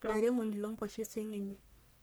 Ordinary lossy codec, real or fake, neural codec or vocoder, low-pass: none; fake; codec, 44.1 kHz, 1.7 kbps, Pupu-Codec; none